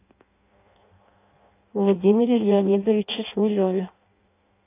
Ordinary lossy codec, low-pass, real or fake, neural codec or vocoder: AAC, 24 kbps; 3.6 kHz; fake; codec, 16 kHz in and 24 kHz out, 0.6 kbps, FireRedTTS-2 codec